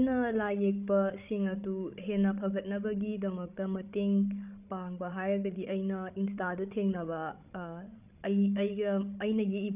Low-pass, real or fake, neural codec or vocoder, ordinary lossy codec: 3.6 kHz; fake; codec, 16 kHz, 16 kbps, FreqCodec, larger model; none